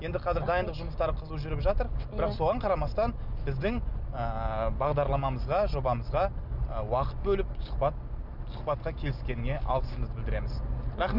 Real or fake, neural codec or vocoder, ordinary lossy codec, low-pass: real; none; none; 5.4 kHz